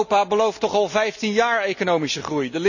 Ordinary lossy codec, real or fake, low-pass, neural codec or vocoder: none; real; 7.2 kHz; none